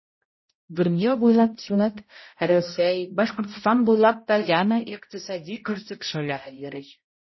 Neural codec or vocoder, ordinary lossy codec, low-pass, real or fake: codec, 16 kHz, 0.5 kbps, X-Codec, HuBERT features, trained on balanced general audio; MP3, 24 kbps; 7.2 kHz; fake